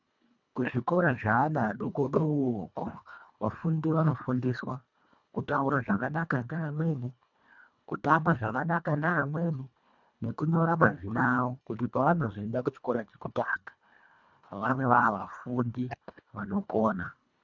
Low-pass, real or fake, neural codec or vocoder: 7.2 kHz; fake; codec, 24 kHz, 1.5 kbps, HILCodec